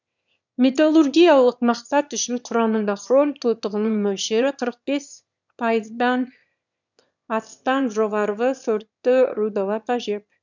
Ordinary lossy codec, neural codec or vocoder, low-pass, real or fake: none; autoencoder, 22.05 kHz, a latent of 192 numbers a frame, VITS, trained on one speaker; 7.2 kHz; fake